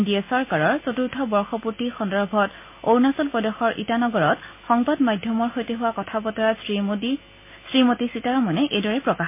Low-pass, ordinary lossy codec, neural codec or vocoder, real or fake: 3.6 kHz; MP3, 24 kbps; none; real